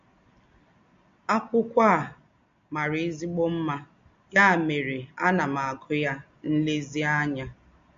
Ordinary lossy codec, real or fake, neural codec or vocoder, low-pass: MP3, 48 kbps; real; none; 7.2 kHz